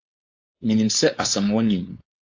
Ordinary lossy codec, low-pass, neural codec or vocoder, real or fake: AAC, 48 kbps; 7.2 kHz; codec, 16 kHz, 4.8 kbps, FACodec; fake